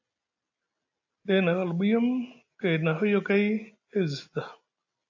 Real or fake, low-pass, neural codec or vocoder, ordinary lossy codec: real; 7.2 kHz; none; AAC, 48 kbps